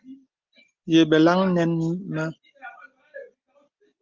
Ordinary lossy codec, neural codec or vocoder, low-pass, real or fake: Opus, 24 kbps; none; 7.2 kHz; real